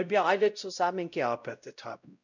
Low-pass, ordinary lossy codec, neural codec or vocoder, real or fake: 7.2 kHz; none; codec, 16 kHz, 0.5 kbps, X-Codec, WavLM features, trained on Multilingual LibriSpeech; fake